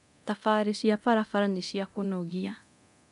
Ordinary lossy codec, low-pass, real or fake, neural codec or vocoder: MP3, 96 kbps; 10.8 kHz; fake; codec, 24 kHz, 0.9 kbps, DualCodec